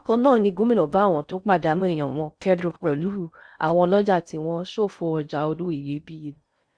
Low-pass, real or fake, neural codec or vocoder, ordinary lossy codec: 9.9 kHz; fake; codec, 16 kHz in and 24 kHz out, 0.6 kbps, FocalCodec, streaming, 4096 codes; Opus, 64 kbps